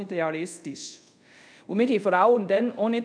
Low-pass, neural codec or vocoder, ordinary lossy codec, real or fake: 9.9 kHz; codec, 24 kHz, 0.5 kbps, DualCodec; none; fake